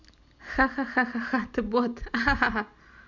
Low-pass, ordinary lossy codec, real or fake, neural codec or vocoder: 7.2 kHz; none; real; none